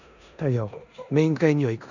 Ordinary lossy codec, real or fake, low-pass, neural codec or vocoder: none; fake; 7.2 kHz; codec, 16 kHz in and 24 kHz out, 0.9 kbps, LongCat-Audio-Codec, four codebook decoder